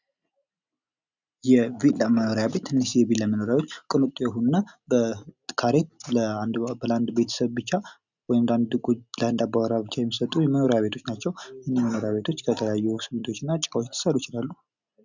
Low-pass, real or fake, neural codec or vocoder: 7.2 kHz; real; none